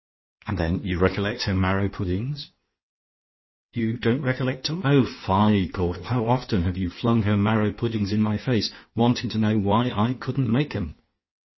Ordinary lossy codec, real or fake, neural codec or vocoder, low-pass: MP3, 24 kbps; fake; codec, 16 kHz in and 24 kHz out, 1.1 kbps, FireRedTTS-2 codec; 7.2 kHz